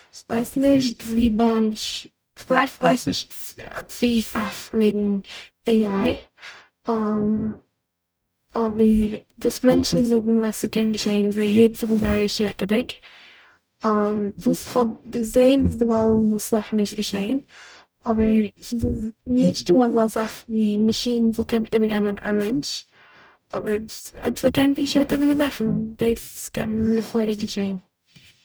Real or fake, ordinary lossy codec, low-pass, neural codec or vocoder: fake; none; none; codec, 44.1 kHz, 0.9 kbps, DAC